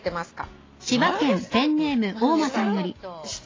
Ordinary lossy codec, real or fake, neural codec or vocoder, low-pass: AAC, 32 kbps; real; none; 7.2 kHz